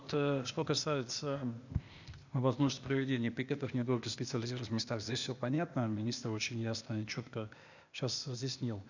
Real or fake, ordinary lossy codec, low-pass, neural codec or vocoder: fake; none; 7.2 kHz; codec, 16 kHz, 0.8 kbps, ZipCodec